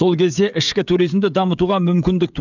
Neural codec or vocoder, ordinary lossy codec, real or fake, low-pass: codec, 16 kHz, 16 kbps, FreqCodec, smaller model; none; fake; 7.2 kHz